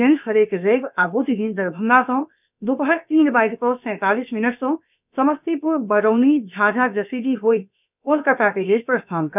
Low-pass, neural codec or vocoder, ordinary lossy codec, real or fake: 3.6 kHz; codec, 16 kHz, about 1 kbps, DyCAST, with the encoder's durations; none; fake